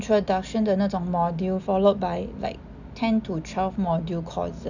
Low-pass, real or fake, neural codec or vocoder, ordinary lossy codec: 7.2 kHz; real; none; none